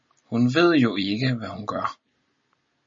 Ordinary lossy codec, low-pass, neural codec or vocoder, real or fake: MP3, 32 kbps; 7.2 kHz; none; real